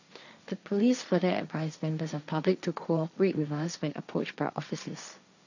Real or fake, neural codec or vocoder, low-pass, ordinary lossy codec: fake; codec, 16 kHz, 1.1 kbps, Voila-Tokenizer; 7.2 kHz; none